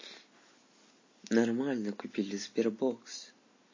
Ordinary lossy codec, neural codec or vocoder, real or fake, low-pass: MP3, 32 kbps; none; real; 7.2 kHz